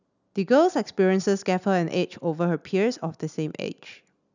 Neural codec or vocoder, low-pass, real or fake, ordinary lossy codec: none; 7.2 kHz; real; none